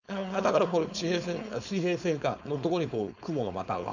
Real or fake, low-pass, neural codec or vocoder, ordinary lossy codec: fake; 7.2 kHz; codec, 16 kHz, 4.8 kbps, FACodec; none